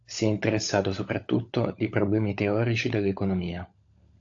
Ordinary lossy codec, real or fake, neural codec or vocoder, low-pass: AAC, 48 kbps; fake; codec, 16 kHz, 16 kbps, FunCodec, trained on LibriTTS, 50 frames a second; 7.2 kHz